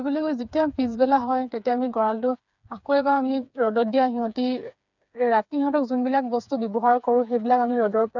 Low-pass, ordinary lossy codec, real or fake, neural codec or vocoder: 7.2 kHz; none; fake; codec, 16 kHz, 4 kbps, FreqCodec, smaller model